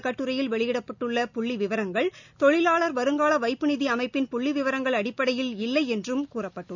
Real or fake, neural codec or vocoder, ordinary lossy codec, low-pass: real; none; none; 7.2 kHz